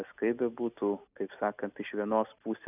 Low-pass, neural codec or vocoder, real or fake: 3.6 kHz; none; real